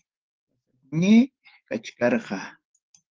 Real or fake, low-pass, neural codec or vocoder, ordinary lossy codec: fake; 7.2 kHz; autoencoder, 48 kHz, 128 numbers a frame, DAC-VAE, trained on Japanese speech; Opus, 16 kbps